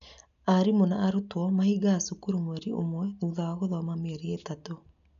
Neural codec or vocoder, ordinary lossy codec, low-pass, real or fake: none; none; 7.2 kHz; real